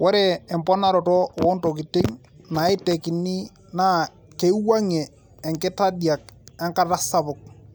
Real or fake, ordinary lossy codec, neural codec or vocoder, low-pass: real; none; none; none